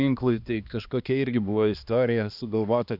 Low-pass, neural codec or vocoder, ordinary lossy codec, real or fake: 5.4 kHz; codec, 16 kHz, 2 kbps, X-Codec, HuBERT features, trained on balanced general audio; Opus, 64 kbps; fake